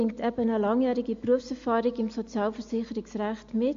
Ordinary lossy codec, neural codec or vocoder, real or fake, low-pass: MP3, 48 kbps; none; real; 7.2 kHz